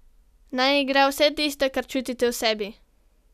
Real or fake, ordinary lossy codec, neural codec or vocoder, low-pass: real; none; none; 14.4 kHz